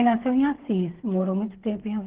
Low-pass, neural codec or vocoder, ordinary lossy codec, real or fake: 3.6 kHz; codec, 16 kHz, 8 kbps, FreqCodec, smaller model; Opus, 16 kbps; fake